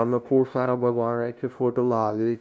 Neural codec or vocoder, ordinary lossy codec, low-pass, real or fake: codec, 16 kHz, 0.5 kbps, FunCodec, trained on LibriTTS, 25 frames a second; none; none; fake